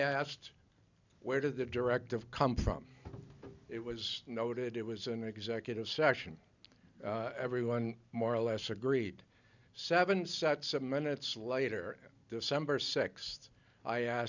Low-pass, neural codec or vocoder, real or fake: 7.2 kHz; none; real